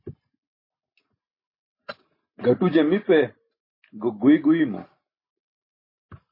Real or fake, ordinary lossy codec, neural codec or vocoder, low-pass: real; MP3, 24 kbps; none; 5.4 kHz